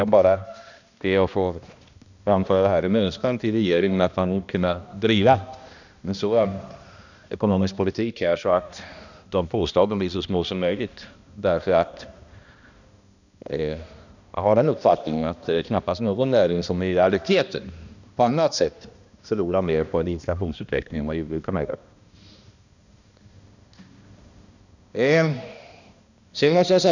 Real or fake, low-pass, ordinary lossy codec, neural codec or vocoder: fake; 7.2 kHz; none; codec, 16 kHz, 1 kbps, X-Codec, HuBERT features, trained on balanced general audio